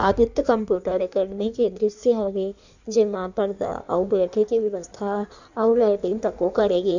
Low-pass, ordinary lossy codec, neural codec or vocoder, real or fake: 7.2 kHz; none; codec, 16 kHz in and 24 kHz out, 1.1 kbps, FireRedTTS-2 codec; fake